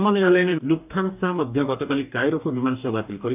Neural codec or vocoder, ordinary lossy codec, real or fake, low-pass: codec, 44.1 kHz, 2.6 kbps, DAC; AAC, 32 kbps; fake; 3.6 kHz